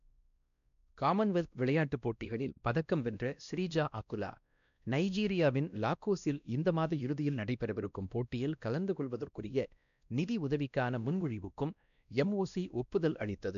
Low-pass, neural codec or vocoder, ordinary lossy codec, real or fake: 7.2 kHz; codec, 16 kHz, 1 kbps, X-Codec, WavLM features, trained on Multilingual LibriSpeech; none; fake